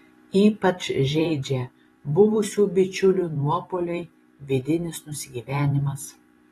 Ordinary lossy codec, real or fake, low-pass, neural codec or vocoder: AAC, 32 kbps; fake; 19.8 kHz; vocoder, 44.1 kHz, 128 mel bands every 512 samples, BigVGAN v2